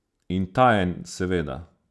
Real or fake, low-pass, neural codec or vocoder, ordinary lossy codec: real; none; none; none